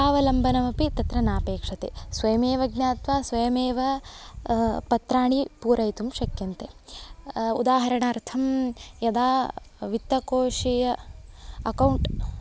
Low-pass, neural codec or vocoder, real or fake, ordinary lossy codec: none; none; real; none